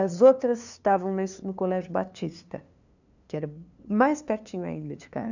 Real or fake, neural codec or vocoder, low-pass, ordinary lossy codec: fake; codec, 16 kHz, 2 kbps, FunCodec, trained on LibriTTS, 25 frames a second; 7.2 kHz; none